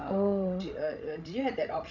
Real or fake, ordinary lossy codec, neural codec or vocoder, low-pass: fake; none; codec, 16 kHz, 16 kbps, FreqCodec, larger model; 7.2 kHz